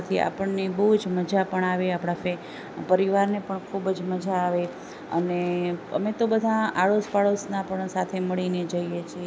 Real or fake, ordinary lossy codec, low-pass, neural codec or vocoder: real; none; none; none